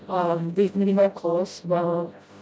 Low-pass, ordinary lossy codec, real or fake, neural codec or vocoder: none; none; fake; codec, 16 kHz, 0.5 kbps, FreqCodec, smaller model